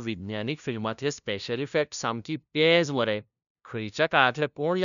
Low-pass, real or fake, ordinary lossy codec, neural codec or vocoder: 7.2 kHz; fake; none; codec, 16 kHz, 0.5 kbps, FunCodec, trained on LibriTTS, 25 frames a second